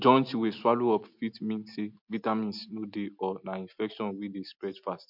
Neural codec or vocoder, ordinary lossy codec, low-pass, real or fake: none; MP3, 48 kbps; 5.4 kHz; real